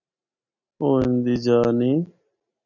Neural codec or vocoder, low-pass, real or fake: none; 7.2 kHz; real